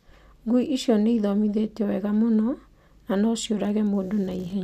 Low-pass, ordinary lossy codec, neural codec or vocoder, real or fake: 14.4 kHz; none; none; real